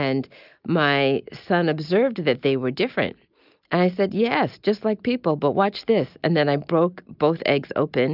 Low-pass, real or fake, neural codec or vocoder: 5.4 kHz; real; none